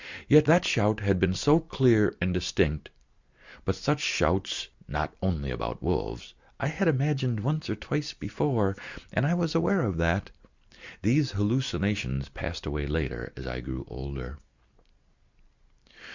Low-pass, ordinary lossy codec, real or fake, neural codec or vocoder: 7.2 kHz; Opus, 64 kbps; real; none